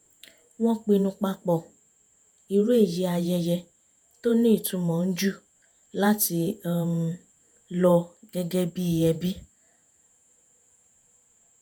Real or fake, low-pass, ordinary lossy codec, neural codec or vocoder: fake; none; none; vocoder, 48 kHz, 128 mel bands, Vocos